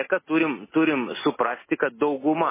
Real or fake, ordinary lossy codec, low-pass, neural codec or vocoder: real; MP3, 16 kbps; 3.6 kHz; none